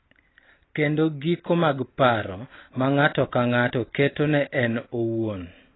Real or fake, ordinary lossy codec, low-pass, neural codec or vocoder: real; AAC, 16 kbps; 7.2 kHz; none